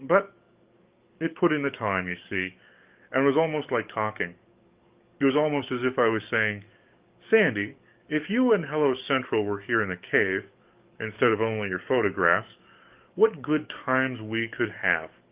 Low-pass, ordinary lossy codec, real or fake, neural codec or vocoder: 3.6 kHz; Opus, 32 kbps; fake; codec, 44.1 kHz, 7.8 kbps, DAC